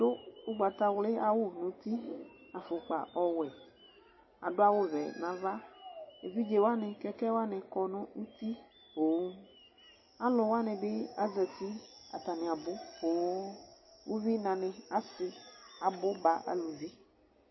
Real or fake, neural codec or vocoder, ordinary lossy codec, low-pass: real; none; MP3, 24 kbps; 7.2 kHz